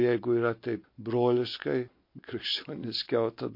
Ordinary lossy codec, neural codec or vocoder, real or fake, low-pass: MP3, 32 kbps; none; real; 5.4 kHz